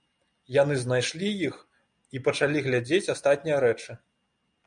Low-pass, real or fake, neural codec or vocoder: 9.9 kHz; real; none